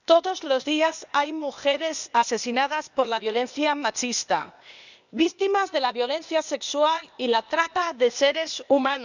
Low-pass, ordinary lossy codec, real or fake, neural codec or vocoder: 7.2 kHz; none; fake; codec, 16 kHz, 0.8 kbps, ZipCodec